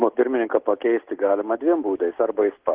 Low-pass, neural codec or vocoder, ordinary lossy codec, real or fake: 3.6 kHz; none; Opus, 16 kbps; real